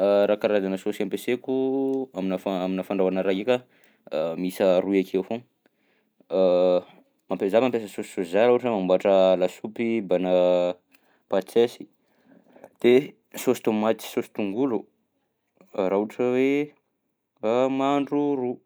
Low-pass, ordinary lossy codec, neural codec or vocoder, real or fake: none; none; none; real